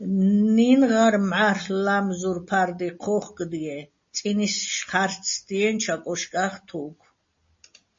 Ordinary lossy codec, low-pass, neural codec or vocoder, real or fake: MP3, 32 kbps; 7.2 kHz; none; real